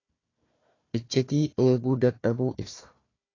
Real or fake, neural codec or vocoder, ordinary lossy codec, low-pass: fake; codec, 16 kHz, 1 kbps, FunCodec, trained on Chinese and English, 50 frames a second; AAC, 32 kbps; 7.2 kHz